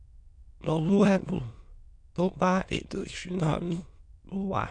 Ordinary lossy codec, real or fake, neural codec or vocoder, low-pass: none; fake; autoencoder, 22.05 kHz, a latent of 192 numbers a frame, VITS, trained on many speakers; 9.9 kHz